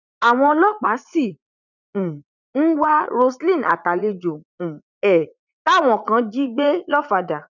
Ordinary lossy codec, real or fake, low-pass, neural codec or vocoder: none; fake; 7.2 kHz; vocoder, 44.1 kHz, 80 mel bands, Vocos